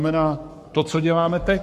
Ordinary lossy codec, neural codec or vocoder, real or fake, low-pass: MP3, 64 kbps; codec, 44.1 kHz, 7.8 kbps, Pupu-Codec; fake; 14.4 kHz